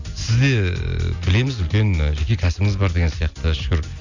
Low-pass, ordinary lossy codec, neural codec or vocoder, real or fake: 7.2 kHz; none; none; real